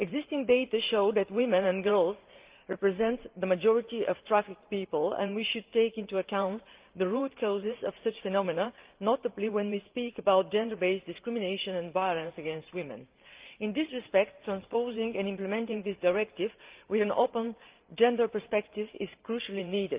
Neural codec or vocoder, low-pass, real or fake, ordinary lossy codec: vocoder, 44.1 kHz, 128 mel bands every 512 samples, BigVGAN v2; 3.6 kHz; fake; Opus, 32 kbps